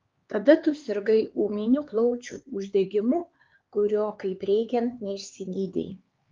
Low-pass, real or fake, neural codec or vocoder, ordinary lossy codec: 7.2 kHz; fake; codec, 16 kHz, 4 kbps, X-Codec, HuBERT features, trained on LibriSpeech; Opus, 24 kbps